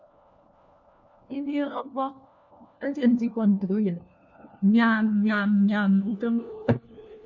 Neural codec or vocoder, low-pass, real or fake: codec, 16 kHz, 1 kbps, FunCodec, trained on LibriTTS, 50 frames a second; 7.2 kHz; fake